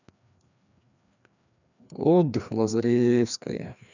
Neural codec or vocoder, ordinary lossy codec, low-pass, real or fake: codec, 16 kHz, 2 kbps, FreqCodec, larger model; none; 7.2 kHz; fake